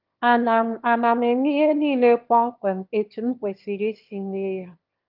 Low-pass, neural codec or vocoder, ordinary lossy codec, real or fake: 5.4 kHz; autoencoder, 22.05 kHz, a latent of 192 numbers a frame, VITS, trained on one speaker; Opus, 32 kbps; fake